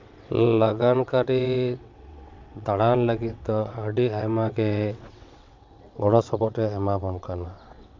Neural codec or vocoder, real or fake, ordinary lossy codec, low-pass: vocoder, 22.05 kHz, 80 mel bands, WaveNeXt; fake; MP3, 64 kbps; 7.2 kHz